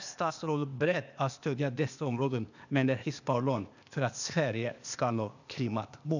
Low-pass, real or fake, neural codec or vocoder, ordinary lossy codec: 7.2 kHz; fake; codec, 16 kHz, 0.8 kbps, ZipCodec; none